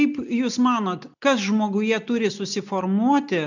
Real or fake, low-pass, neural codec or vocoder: real; 7.2 kHz; none